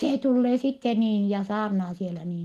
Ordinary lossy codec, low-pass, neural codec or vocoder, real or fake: Opus, 24 kbps; 14.4 kHz; none; real